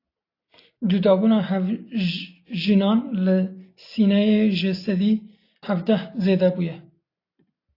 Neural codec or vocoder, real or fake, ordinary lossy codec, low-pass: none; real; AAC, 32 kbps; 5.4 kHz